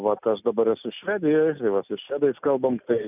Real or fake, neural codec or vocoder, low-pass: real; none; 3.6 kHz